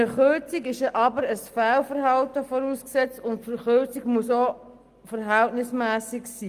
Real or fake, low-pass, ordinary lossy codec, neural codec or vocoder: real; 14.4 kHz; Opus, 32 kbps; none